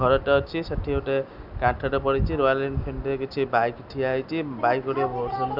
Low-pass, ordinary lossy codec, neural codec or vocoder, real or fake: 5.4 kHz; none; none; real